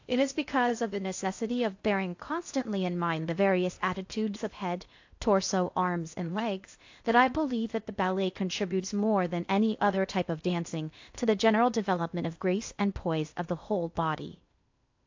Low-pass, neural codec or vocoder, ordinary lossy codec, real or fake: 7.2 kHz; codec, 16 kHz in and 24 kHz out, 0.6 kbps, FocalCodec, streaming, 2048 codes; AAC, 48 kbps; fake